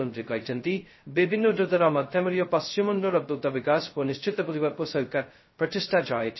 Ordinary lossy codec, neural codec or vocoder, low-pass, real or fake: MP3, 24 kbps; codec, 16 kHz, 0.2 kbps, FocalCodec; 7.2 kHz; fake